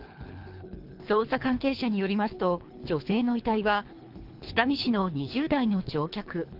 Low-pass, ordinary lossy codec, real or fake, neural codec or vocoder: 5.4 kHz; Opus, 32 kbps; fake; codec, 24 kHz, 3 kbps, HILCodec